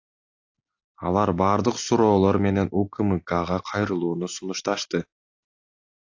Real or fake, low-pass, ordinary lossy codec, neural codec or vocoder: real; 7.2 kHz; AAC, 48 kbps; none